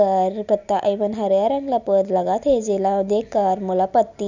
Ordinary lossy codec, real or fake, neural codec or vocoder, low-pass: none; real; none; 7.2 kHz